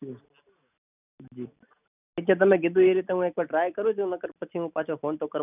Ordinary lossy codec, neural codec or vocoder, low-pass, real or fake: none; none; 3.6 kHz; real